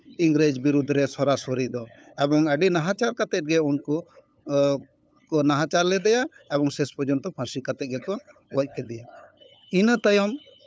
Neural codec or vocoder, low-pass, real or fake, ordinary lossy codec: codec, 16 kHz, 8 kbps, FunCodec, trained on LibriTTS, 25 frames a second; none; fake; none